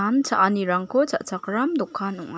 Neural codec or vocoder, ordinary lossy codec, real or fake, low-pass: none; none; real; none